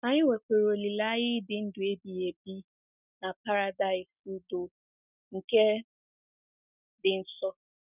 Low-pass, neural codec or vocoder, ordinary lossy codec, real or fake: 3.6 kHz; none; none; real